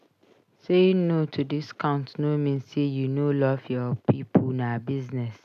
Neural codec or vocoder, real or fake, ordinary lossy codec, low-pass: none; real; none; 14.4 kHz